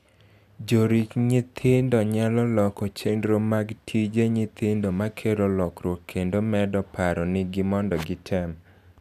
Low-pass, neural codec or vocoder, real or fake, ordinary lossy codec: 14.4 kHz; none; real; none